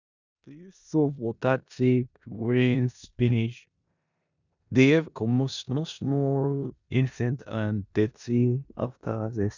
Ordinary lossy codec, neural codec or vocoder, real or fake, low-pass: none; codec, 16 kHz in and 24 kHz out, 0.9 kbps, LongCat-Audio-Codec, four codebook decoder; fake; 7.2 kHz